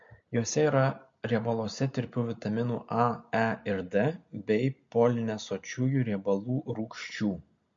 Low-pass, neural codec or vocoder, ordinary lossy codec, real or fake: 7.2 kHz; none; MP3, 48 kbps; real